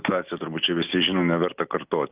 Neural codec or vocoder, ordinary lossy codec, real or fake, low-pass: none; Opus, 32 kbps; real; 3.6 kHz